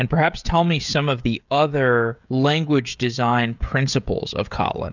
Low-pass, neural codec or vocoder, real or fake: 7.2 kHz; codec, 16 kHz, 16 kbps, FreqCodec, smaller model; fake